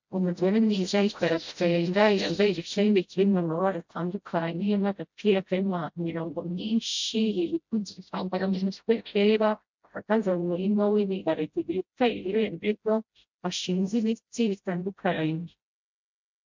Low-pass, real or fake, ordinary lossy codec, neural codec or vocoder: 7.2 kHz; fake; MP3, 64 kbps; codec, 16 kHz, 0.5 kbps, FreqCodec, smaller model